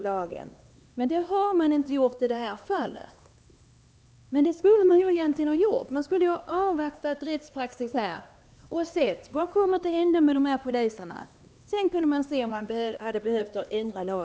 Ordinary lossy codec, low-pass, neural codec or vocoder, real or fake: none; none; codec, 16 kHz, 2 kbps, X-Codec, HuBERT features, trained on LibriSpeech; fake